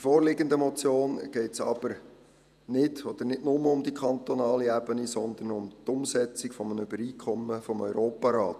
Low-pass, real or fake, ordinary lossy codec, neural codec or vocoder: 14.4 kHz; fake; none; vocoder, 48 kHz, 128 mel bands, Vocos